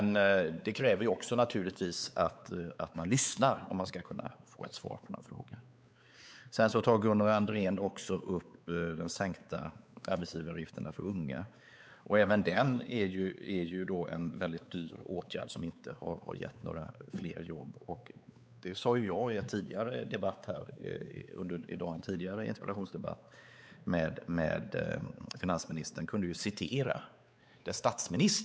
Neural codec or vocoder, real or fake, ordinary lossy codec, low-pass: codec, 16 kHz, 4 kbps, X-Codec, WavLM features, trained on Multilingual LibriSpeech; fake; none; none